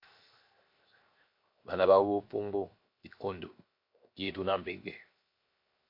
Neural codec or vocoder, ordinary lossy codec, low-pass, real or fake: codec, 16 kHz, 0.7 kbps, FocalCodec; MP3, 32 kbps; 5.4 kHz; fake